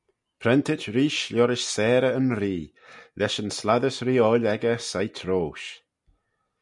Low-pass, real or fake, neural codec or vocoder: 10.8 kHz; real; none